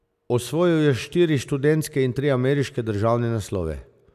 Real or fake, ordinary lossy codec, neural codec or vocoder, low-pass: real; none; none; 14.4 kHz